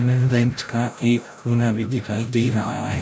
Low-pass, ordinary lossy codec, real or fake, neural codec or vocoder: none; none; fake; codec, 16 kHz, 0.5 kbps, FreqCodec, larger model